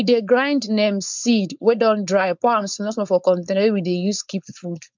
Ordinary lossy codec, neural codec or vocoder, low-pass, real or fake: MP3, 64 kbps; codec, 16 kHz, 4.8 kbps, FACodec; 7.2 kHz; fake